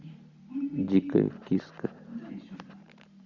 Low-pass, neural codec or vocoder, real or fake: 7.2 kHz; vocoder, 44.1 kHz, 128 mel bands every 512 samples, BigVGAN v2; fake